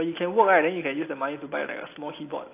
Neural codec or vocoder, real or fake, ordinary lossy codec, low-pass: none; real; AAC, 24 kbps; 3.6 kHz